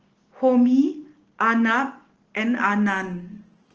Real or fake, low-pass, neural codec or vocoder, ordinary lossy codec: real; 7.2 kHz; none; Opus, 16 kbps